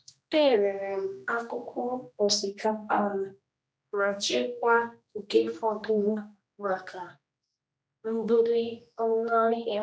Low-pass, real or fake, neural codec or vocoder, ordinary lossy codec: none; fake; codec, 16 kHz, 1 kbps, X-Codec, HuBERT features, trained on general audio; none